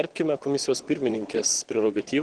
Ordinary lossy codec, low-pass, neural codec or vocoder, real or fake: Opus, 16 kbps; 9.9 kHz; vocoder, 22.05 kHz, 80 mel bands, Vocos; fake